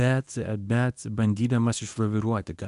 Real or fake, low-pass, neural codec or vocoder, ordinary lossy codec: fake; 10.8 kHz; codec, 24 kHz, 0.9 kbps, WavTokenizer, medium speech release version 1; AAC, 96 kbps